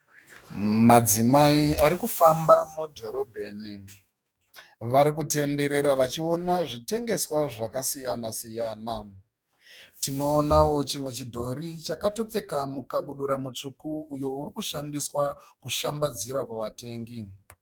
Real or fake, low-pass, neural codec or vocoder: fake; 19.8 kHz; codec, 44.1 kHz, 2.6 kbps, DAC